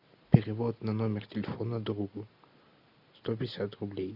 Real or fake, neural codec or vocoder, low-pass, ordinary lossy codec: real; none; 5.4 kHz; AAC, 48 kbps